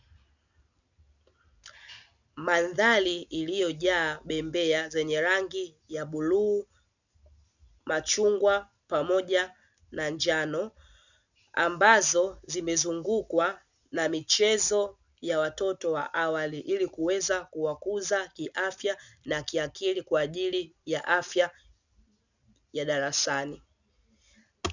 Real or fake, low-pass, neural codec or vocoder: real; 7.2 kHz; none